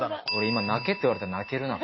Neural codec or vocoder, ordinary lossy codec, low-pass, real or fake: none; MP3, 24 kbps; 7.2 kHz; real